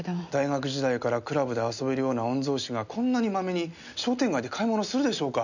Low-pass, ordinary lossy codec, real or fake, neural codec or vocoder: 7.2 kHz; none; real; none